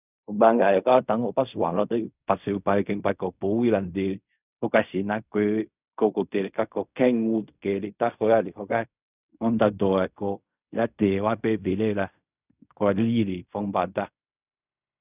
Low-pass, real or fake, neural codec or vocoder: 3.6 kHz; fake; codec, 16 kHz in and 24 kHz out, 0.4 kbps, LongCat-Audio-Codec, fine tuned four codebook decoder